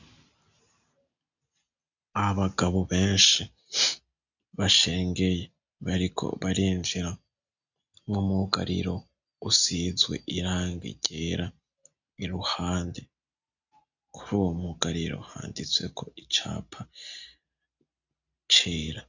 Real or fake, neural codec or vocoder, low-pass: real; none; 7.2 kHz